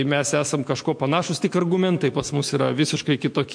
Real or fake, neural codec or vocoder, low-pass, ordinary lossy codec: fake; autoencoder, 48 kHz, 128 numbers a frame, DAC-VAE, trained on Japanese speech; 9.9 kHz; MP3, 48 kbps